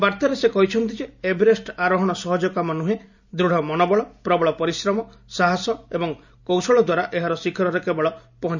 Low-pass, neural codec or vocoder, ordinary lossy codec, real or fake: 7.2 kHz; none; none; real